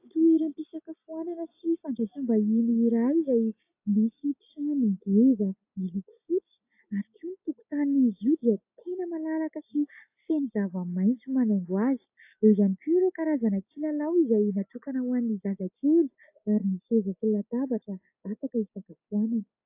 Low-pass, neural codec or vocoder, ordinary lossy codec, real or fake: 3.6 kHz; none; AAC, 32 kbps; real